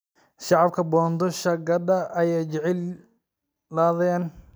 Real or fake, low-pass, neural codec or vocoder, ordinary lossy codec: real; none; none; none